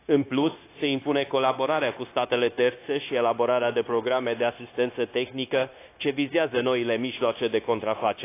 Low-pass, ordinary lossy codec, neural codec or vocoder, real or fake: 3.6 kHz; AAC, 24 kbps; codec, 16 kHz, 0.9 kbps, LongCat-Audio-Codec; fake